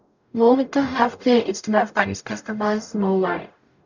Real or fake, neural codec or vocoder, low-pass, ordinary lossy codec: fake; codec, 44.1 kHz, 0.9 kbps, DAC; 7.2 kHz; none